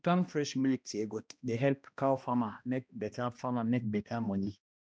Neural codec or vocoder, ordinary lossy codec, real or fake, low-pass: codec, 16 kHz, 1 kbps, X-Codec, HuBERT features, trained on balanced general audio; none; fake; none